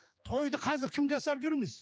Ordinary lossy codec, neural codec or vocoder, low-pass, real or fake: none; codec, 16 kHz, 2 kbps, X-Codec, HuBERT features, trained on general audio; none; fake